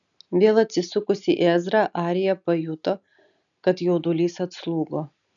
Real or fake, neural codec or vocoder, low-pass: real; none; 7.2 kHz